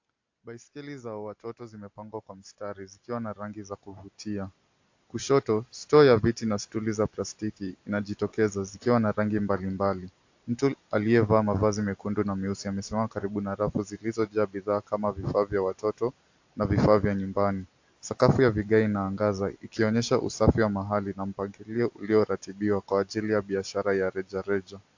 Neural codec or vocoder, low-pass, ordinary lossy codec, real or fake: none; 7.2 kHz; AAC, 48 kbps; real